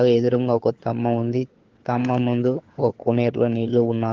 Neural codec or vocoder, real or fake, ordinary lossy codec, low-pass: codec, 16 kHz, 4 kbps, FunCodec, trained on LibriTTS, 50 frames a second; fake; Opus, 16 kbps; 7.2 kHz